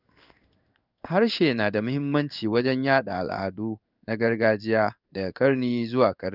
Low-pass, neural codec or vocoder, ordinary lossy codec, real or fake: 5.4 kHz; codec, 16 kHz in and 24 kHz out, 1 kbps, XY-Tokenizer; none; fake